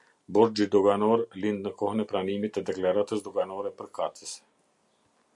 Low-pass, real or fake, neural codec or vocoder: 10.8 kHz; real; none